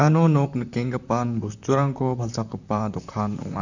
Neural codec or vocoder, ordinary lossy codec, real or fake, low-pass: vocoder, 44.1 kHz, 128 mel bands, Pupu-Vocoder; none; fake; 7.2 kHz